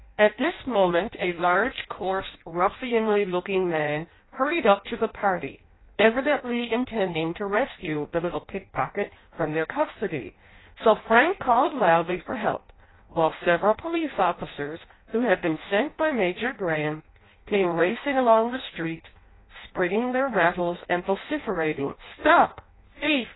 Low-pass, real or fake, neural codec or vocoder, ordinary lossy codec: 7.2 kHz; fake; codec, 16 kHz in and 24 kHz out, 0.6 kbps, FireRedTTS-2 codec; AAC, 16 kbps